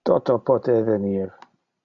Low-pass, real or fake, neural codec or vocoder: 7.2 kHz; real; none